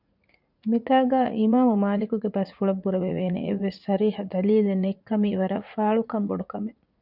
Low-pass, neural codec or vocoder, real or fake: 5.4 kHz; vocoder, 44.1 kHz, 80 mel bands, Vocos; fake